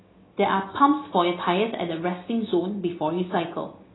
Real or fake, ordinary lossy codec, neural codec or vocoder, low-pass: real; AAC, 16 kbps; none; 7.2 kHz